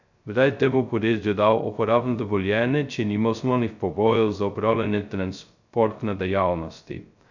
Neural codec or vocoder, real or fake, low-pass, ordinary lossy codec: codec, 16 kHz, 0.2 kbps, FocalCodec; fake; 7.2 kHz; none